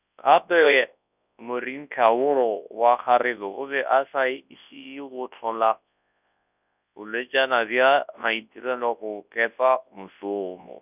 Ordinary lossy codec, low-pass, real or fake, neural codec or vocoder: none; 3.6 kHz; fake; codec, 24 kHz, 0.9 kbps, WavTokenizer, large speech release